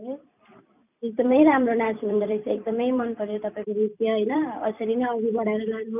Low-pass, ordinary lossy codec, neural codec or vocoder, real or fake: 3.6 kHz; none; vocoder, 44.1 kHz, 128 mel bands every 256 samples, BigVGAN v2; fake